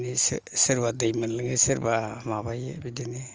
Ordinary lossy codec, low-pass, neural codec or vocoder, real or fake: Opus, 24 kbps; 7.2 kHz; none; real